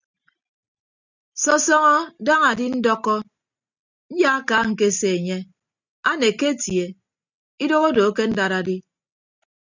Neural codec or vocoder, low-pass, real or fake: none; 7.2 kHz; real